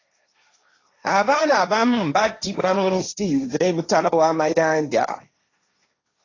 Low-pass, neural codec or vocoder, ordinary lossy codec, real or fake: 7.2 kHz; codec, 16 kHz, 1.1 kbps, Voila-Tokenizer; AAC, 32 kbps; fake